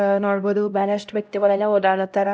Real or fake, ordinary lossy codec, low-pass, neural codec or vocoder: fake; none; none; codec, 16 kHz, 0.5 kbps, X-Codec, HuBERT features, trained on LibriSpeech